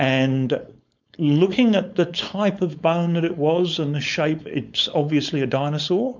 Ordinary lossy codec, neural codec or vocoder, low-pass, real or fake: MP3, 64 kbps; codec, 16 kHz, 4.8 kbps, FACodec; 7.2 kHz; fake